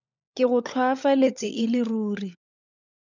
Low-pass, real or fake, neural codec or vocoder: 7.2 kHz; fake; codec, 16 kHz, 16 kbps, FunCodec, trained on LibriTTS, 50 frames a second